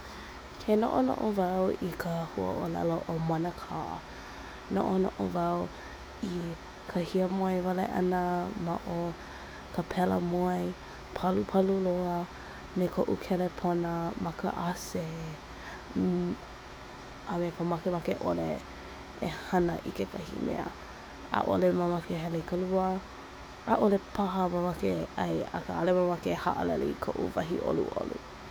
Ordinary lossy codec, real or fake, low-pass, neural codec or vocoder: none; fake; none; autoencoder, 48 kHz, 128 numbers a frame, DAC-VAE, trained on Japanese speech